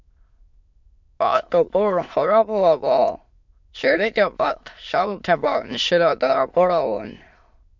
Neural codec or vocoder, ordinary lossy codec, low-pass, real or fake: autoencoder, 22.05 kHz, a latent of 192 numbers a frame, VITS, trained on many speakers; MP3, 64 kbps; 7.2 kHz; fake